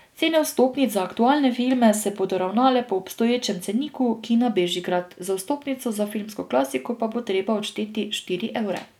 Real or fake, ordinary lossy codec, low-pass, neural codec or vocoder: real; none; 19.8 kHz; none